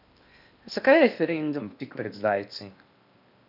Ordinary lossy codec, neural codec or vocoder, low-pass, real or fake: none; codec, 16 kHz in and 24 kHz out, 0.8 kbps, FocalCodec, streaming, 65536 codes; 5.4 kHz; fake